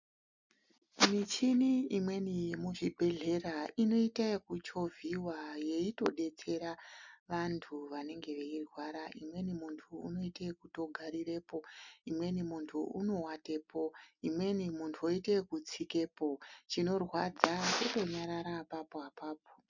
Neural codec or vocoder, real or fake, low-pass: none; real; 7.2 kHz